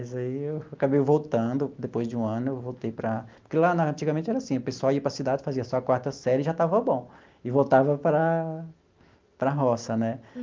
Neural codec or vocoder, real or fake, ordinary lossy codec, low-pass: none; real; Opus, 32 kbps; 7.2 kHz